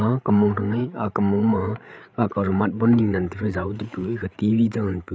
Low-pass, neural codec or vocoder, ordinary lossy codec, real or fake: none; codec, 16 kHz, 8 kbps, FreqCodec, larger model; none; fake